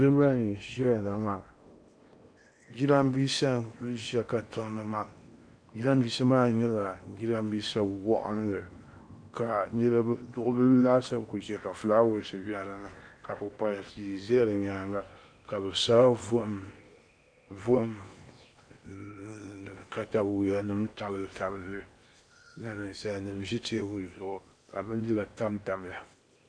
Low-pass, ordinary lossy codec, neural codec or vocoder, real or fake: 9.9 kHz; AAC, 64 kbps; codec, 16 kHz in and 24 kHz out, 0.8 kbps, FocalCodec, streaming, 65536 codes; fake